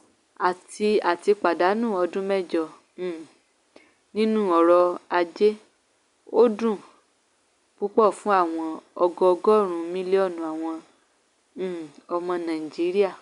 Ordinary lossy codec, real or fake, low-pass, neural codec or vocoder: none; real; 10.8 kHz; none